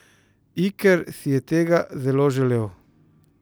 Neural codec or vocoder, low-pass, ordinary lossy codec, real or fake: none; none; none; real